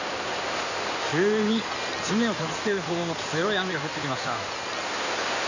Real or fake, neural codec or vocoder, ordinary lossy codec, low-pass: fake; codec, 16 kHz in and 24 kHz out, 2.2 kbps, FireRedTTS-2 codec; none; 7.2 kHz